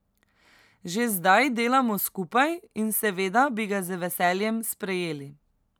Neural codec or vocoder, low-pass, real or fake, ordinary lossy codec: none; none; real; none